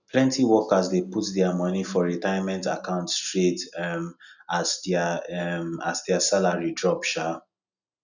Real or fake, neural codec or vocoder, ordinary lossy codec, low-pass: real; none; none; 7.2 kHz